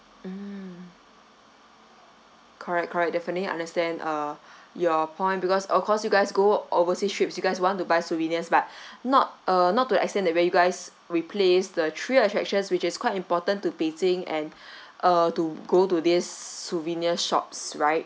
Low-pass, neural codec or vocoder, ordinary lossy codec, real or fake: none; none; none; real